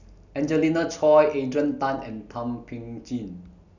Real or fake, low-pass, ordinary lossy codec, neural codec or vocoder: real; 7.2 kHz; none; none